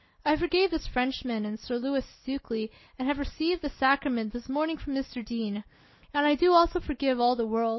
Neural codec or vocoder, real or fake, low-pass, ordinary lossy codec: none; real; 7.2 kHz; MP3, 24 kbps